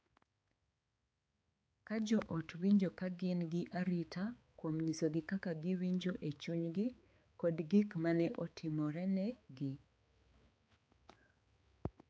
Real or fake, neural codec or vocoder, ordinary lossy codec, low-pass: fake; codec, 16 kHz, 4 kbps, X-Codec, HuBERT features, trained on balanced general audio; none; none